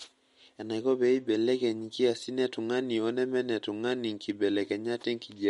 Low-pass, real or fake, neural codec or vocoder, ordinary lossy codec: 19.8 kHz; real; none; MP3, 48 kbps